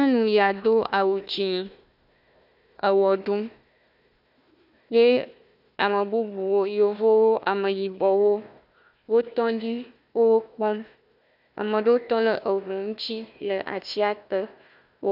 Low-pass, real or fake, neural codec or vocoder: 5.4 kHz; fake; codec, 16 kHz, 1 kbps, FunCodec, trained on Chinese and English, 50 frames a second